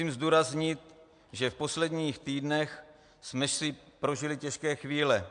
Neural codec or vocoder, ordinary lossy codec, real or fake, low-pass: none; MP3, 64 kbps; real; 9.9 kHz